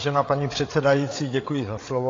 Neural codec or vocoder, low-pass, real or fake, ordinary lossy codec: codec, 16 kHz, 8 kbps, FreqCodec, larger model; 7.2 kHz; fake; AAC, 32 kbps